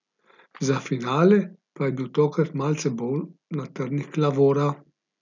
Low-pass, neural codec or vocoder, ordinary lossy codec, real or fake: 7.2 kHz; none; none; real